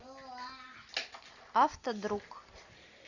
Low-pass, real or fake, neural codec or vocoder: 7.2 kHz; real; none